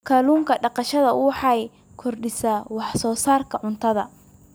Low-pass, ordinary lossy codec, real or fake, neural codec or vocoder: none; none; real; none